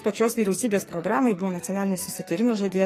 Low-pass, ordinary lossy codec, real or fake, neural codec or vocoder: 14.4 kHz; AAC, 48 kbps; fake; codec, 44.1 kHz, 2.6 kbps, SNAC